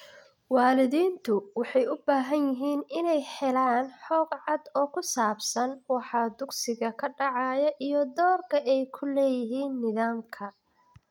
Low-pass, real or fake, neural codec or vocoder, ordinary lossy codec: 19.8 kHz; real; none; none